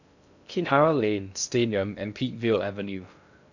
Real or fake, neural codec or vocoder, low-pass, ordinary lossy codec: fake; codec, 16 kHz in and 24 kHz out, 0.6 kbps, FocalCodec, streaming, 2048 codes; 7.2 kHz; none